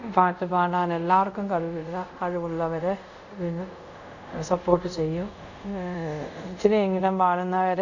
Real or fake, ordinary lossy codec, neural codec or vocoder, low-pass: fake; none; codec, 24 kHz, 0.5 kbps, DualCodec; 7.2 kHz